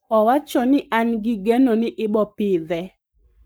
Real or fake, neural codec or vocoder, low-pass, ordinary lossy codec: fake; codec, 44.1 kHz, 7.8 kbps, Pupu-Codec; none; none